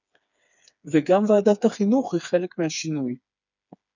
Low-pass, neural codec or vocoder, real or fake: 7.2 kHz; codec, 16 kHz, 4 kbps, FreqCodec, smaller model; fake